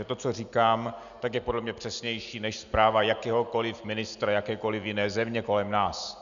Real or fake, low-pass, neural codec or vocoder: real; 7.2 kHz; none